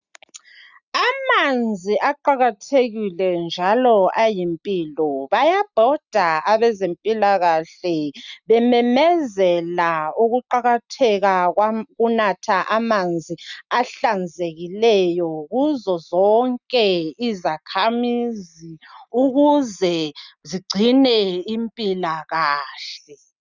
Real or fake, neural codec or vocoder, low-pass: real; none; 7.2 kHz